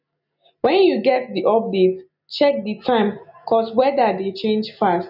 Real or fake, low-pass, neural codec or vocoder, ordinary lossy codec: real; 5.4 kHz; none; none